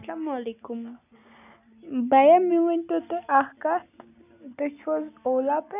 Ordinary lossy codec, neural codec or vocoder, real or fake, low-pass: none; none; real; 3.6 kHz